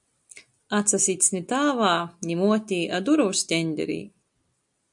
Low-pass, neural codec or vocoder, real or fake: 10.8 kHz; none; real